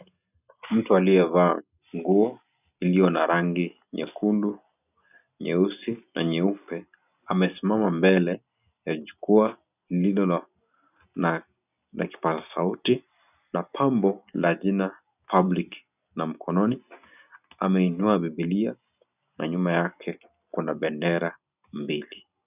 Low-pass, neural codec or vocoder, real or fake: 3.6 kHz; none; real